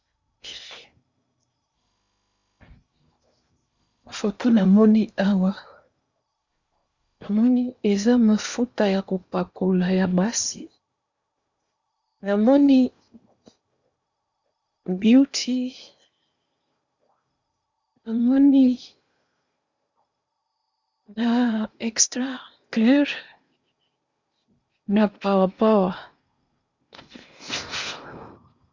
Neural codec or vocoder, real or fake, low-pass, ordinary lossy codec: codec, 16 kHz in and 24 kHz out, 0.8 kbps, FocalCodec, streaming, 65536 codes; fake; 7.2 kHz; Opus, 64 kbps